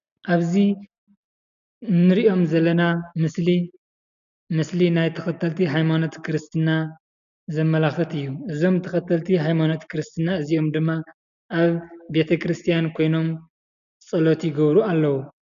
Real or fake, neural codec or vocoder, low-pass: real; none; 7.2 kHz